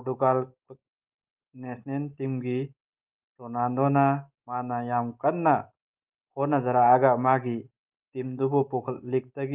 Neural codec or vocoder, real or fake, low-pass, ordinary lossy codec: none; real; 3.6 kHz; Opus, 24 kbps